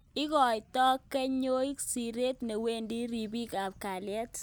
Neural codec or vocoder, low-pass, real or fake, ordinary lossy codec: none; none; real; none